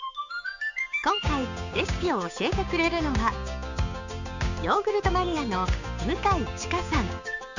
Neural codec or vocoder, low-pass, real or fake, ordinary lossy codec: codec, 16 kHz, 6 kbps, DAC; 7.2 kHz; fake; none